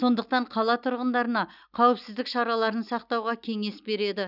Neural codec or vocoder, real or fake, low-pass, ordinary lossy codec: none; real; 5.4 kHz; none